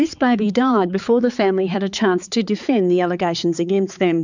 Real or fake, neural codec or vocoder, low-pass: fake; codec, 16 kHz, 4 kbps, X-Codec, HuBERT features, trained on balanced general audio; 7.2 kHz